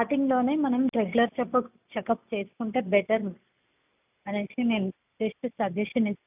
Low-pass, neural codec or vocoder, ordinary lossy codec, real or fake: 3.6 kHz; none; none; real